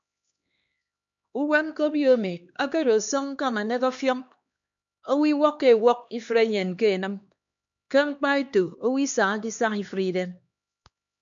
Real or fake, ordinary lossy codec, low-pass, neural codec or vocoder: fake; MP3, 64 kbps; 7.2 kHz; codec, 16 kHz, 2 kbps, X-Codec, HuBERT features, trained on LibriSpeech